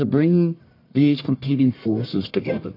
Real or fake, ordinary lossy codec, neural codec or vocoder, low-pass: fake; AAC, 32 kbps; codec, 44.1 kHz, 1.7 kbps, Pupu-Codec; 5.4 kHz